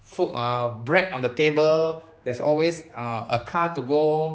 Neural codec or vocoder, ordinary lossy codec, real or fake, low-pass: codec, 16 kHz, 2 kbps, X-Codec, HuBERT features, trained on general audio; none; fake; none